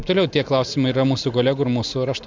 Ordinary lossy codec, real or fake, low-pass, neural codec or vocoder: MP3, 64 kbps; real; 7.2 kHz; none